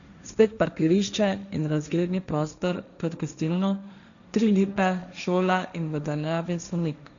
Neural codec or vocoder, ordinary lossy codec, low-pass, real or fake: codec, 16 kHz, 1.1 kbps, Voila-Tokenizer; none; 7.2 kHz; fake